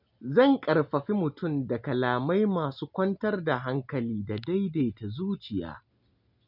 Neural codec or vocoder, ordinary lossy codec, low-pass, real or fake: none; none; 5.4 kHz; real